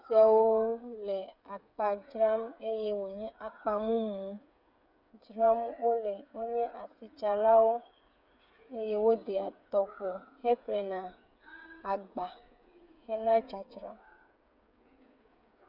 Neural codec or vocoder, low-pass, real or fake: codec, 16 kHz, 8 kbps, FreqCodec, smaller model; 5.4 kHz; fake